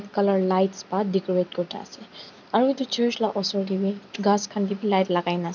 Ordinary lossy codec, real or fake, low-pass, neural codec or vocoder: none; real; 7.2 kHz; none